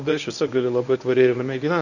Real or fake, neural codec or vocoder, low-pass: fake; codec, 24 kHz, 0.9 kbps, WavTokenizer, medium speech release version 1; 7.2 kHz